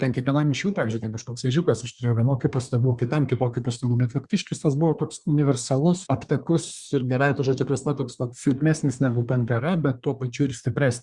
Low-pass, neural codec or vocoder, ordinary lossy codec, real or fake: 10.8 kHz; codec, 24 kHz, 1 kbps, SNAC; Opus, 64 kbps; fake